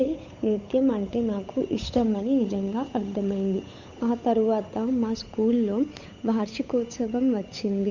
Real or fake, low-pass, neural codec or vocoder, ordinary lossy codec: fake; 7.2 kHz; codec, 16 kHz, 8 kbps, FunCodec, trained on Chinese and English, 25 frames a second; none